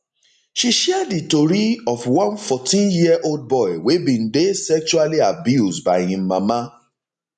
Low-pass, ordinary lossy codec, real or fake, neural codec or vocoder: 10.8 kHz; none; real; none